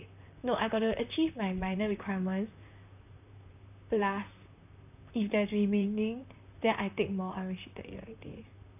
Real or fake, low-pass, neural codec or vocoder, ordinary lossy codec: fake; 3.6 kHz; vocoder, 44.1 kHz, 128 mel bands, Pupu-Vocoder; none